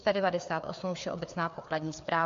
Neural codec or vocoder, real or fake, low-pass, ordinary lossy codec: codec, 16 kHz, 4 kbps, FreqCodec, larger model; fake; 7.2 kHz; MP3, 48 kbps